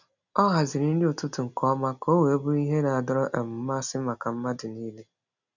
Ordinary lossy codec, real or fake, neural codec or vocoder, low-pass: none; real; none; 7.2 kHz